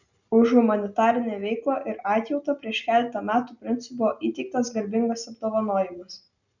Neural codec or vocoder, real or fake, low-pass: none; real; 7.2 kHz